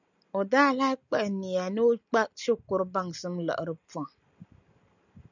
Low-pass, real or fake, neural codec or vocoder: 7.2 kHz; real; none